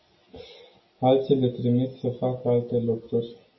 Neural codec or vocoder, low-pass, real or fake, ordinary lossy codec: none; 7.2 kHz; real; MP3, 24 kbps